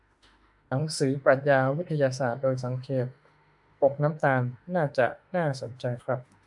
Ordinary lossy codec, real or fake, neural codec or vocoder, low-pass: MP3, 96 kbps; fake; autoencoder, 48 kHz, 32 numbers a frame, DAC-VAE, trained on Japanese speech; 10.8 kHz